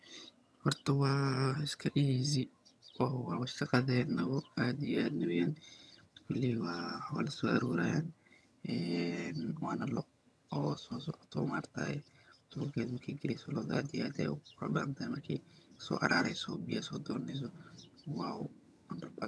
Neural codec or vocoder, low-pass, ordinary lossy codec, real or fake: vocoder, 22.05 kHz, 80 mel bands, HiFi-GAN; none; none; fake